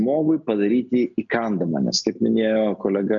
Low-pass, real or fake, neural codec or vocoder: 7.2 kHz; real; none